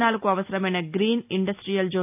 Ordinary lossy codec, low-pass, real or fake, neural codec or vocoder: AAC, 32 kbps; 3.6 kHz; real; none